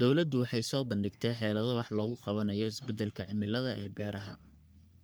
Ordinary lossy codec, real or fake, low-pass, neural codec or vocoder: none; fake; none; codec, 44.1 kHz, 3.4 kbps, Pupu-Codec